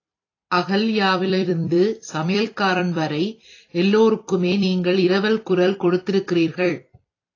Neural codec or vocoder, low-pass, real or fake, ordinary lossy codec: vocoder, 44.1 kHz, 128 mel bands every 256 samples, BigVGAN v2; 7.2 kHz; fake; AAC, 32 kbps